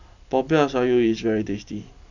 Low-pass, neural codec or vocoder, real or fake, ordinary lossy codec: 7.2 kHz; none; real; none